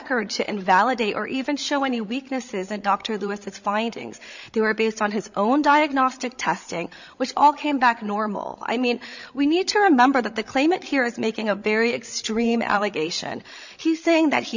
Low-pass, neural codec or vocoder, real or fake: 7.2 kHz; codec, 16 kHz, 16 kbps, FreqCodec, larger model; fake